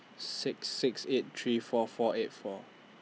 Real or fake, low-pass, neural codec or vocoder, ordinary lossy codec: real; none; none; none